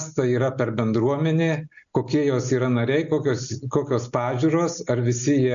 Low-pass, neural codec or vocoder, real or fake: 7.2 kHz; none; real